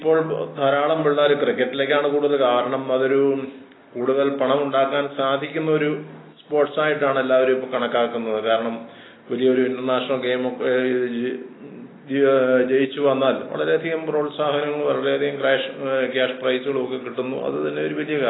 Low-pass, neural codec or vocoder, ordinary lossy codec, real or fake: 7.2 kHz; none; AAC, 16 kbps; real